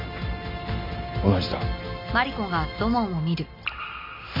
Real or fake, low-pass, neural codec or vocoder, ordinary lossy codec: real; 5.4 kHz; none; MP3, 48 kbps